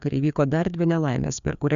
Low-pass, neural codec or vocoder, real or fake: 7.2 kHz; codec, 16 kHz, 2 kbps, FreqCodec, larger model; fake